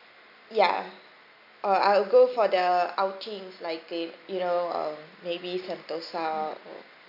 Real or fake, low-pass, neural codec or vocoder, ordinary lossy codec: real; 5.4 kHz; none; none